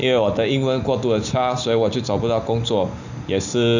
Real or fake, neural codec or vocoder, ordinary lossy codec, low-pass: real; none; none; 7.2 kHz